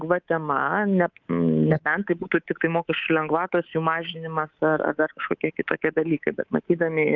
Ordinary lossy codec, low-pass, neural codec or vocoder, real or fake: Opus, 24 kbps; 7.2 kHz; codec, 24 kHz, 3.1 kbps, DualCodec; fake